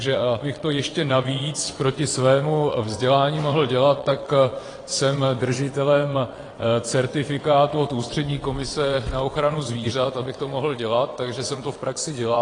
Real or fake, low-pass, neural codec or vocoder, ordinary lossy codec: fake; 9.9 kHz; vocoder, 22.05 kHz, 80 mel bands, WaveNeXt; AAC, 32 kbps